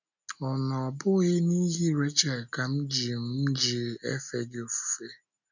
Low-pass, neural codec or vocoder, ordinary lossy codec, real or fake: 7.2 kHz; none; none; real